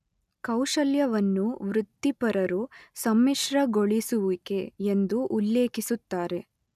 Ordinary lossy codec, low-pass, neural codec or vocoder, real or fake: none; 14.4 kHz; none; real